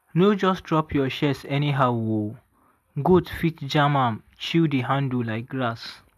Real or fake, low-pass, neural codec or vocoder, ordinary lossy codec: real; 14.4 kHz; none; AAC, 96 kbps